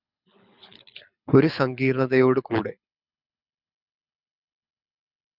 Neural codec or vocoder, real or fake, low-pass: codec, 24 kHz, 6 kbps, HILCodec; fake; 5.4 kHz